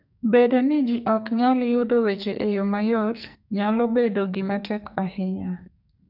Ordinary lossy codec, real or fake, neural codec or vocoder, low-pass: none; fake; codec, 44.1 kHz, 2.6 kbps, SNAC; 5.4 kHz